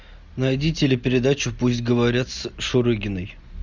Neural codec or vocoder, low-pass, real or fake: none; 7.2 kHz; real